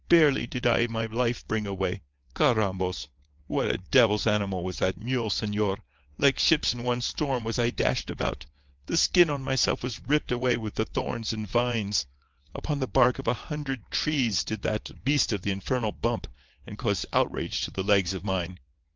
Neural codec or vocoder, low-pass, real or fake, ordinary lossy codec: vocoder, 22.05 kHz, 80 mel bands, WaveNeXt; 7.2 kHz; fake; Opus, 24 kbps